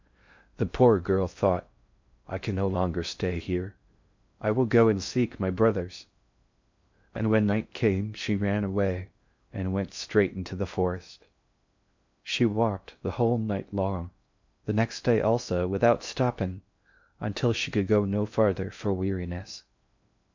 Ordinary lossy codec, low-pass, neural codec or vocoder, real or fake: MP3, 64 kbps; 7.2 kHz; codec, 16 kHz in and 24 kHz out, 0.6 kbps, FocalCodec, streaming, 4096 codes; fake